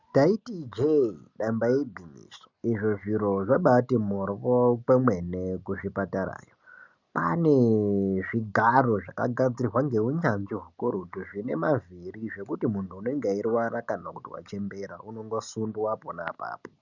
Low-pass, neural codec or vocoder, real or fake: 7.2 kHz; none; real